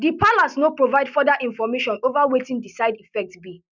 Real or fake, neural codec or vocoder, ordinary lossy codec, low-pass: real; none; none; 7.2 kHz